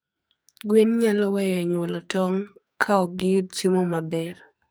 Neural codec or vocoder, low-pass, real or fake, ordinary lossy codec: codec, 44.1 kHz, 2.6 kbps, SNAC; none; fake; none